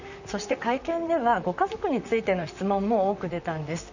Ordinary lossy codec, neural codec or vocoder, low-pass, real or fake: none; vocoder, 44.1 kHz, 128 mel bands, Pupu-Vocoder; 7.2 kHz; fake